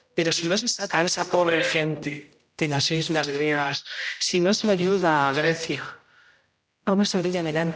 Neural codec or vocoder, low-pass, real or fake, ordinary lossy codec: codec, 16 kHz, 0.5 kbps, X-Codec, HuBERT features, trained on general audio; none; fake; none